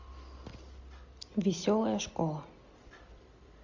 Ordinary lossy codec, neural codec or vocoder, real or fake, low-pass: MP3, 64 kbps; none; real; 7.2 kHz